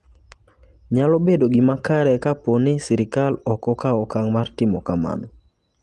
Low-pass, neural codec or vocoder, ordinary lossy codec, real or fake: 10.8 kHz; none; Opus, 24 kbps; real